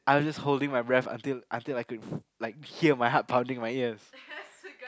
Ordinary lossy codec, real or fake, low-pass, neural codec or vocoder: none; real; none; none